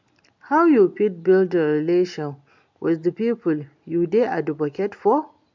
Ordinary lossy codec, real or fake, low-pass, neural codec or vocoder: MP3, 64 kbps; real; 7.2 kHz; none